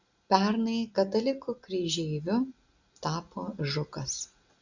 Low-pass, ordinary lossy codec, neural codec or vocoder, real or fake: 7.2 kHz; Opus, 64 kbps; none; real